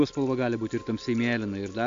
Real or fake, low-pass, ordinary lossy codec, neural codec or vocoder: real; 7.2 kHz; MP3, 48 kbps; none